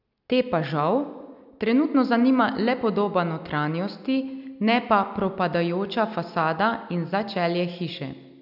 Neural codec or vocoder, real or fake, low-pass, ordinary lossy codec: none; real; 5.4 kHz; none